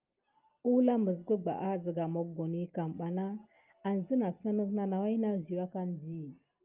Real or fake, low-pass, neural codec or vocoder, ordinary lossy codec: real; 3.6 kHz; none; Opus, 32 kbps